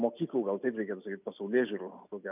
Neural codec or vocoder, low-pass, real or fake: none; 3.6 kHz; real